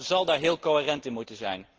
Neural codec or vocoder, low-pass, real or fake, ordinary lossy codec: none; 7.2 kHz; real; Opus, 16 kbps